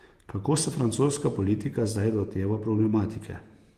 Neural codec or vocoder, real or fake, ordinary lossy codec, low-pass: vocoder, 48 kHz, 128 mel bands, Vocos; fake; Opus, 24 kbps; 14.4 kHz